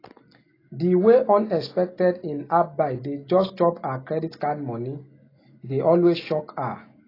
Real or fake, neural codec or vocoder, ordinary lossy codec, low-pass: real; none; AAC, 24 kbps; 5.4 kHz